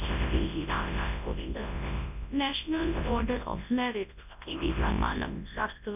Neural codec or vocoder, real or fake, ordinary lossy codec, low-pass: codec, 24 kHz, 0.9 kbps, WavTokenizer, large speech release; fake; none; 3.6 kHz